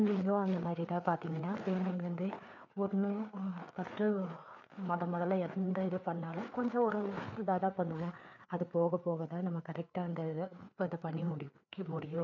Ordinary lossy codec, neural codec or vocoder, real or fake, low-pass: none; codec, 16 kHz, 4 kbps, FreqCodec, larger model; fake; 7.2 kHz